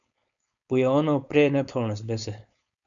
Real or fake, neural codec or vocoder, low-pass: fake; codec, 16 kHz, 4.8 kbps, FACodec; 7.2 kHz